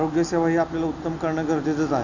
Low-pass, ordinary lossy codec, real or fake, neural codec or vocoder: 7.2 kHz; none; real; none